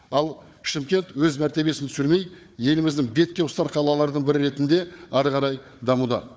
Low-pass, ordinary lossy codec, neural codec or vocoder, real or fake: none; none; codec, 16 kHz, 4 kbps, FunCodec, trained on Chinese and English, 50 frames a second; fake